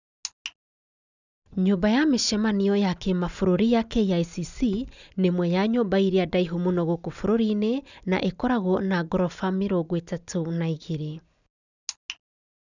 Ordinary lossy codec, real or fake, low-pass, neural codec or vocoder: none; real; 7.2 kHz; none